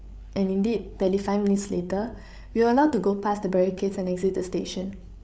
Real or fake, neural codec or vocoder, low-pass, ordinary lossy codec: fake; codec, 16 kHz, 8 kbps, FreqCodec, larger model; none; none